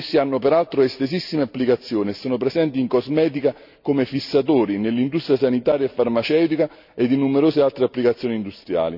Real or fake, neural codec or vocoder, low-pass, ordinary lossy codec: real; none; 5.4 kHz; none